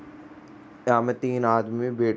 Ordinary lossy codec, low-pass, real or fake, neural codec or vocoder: none; none; real; none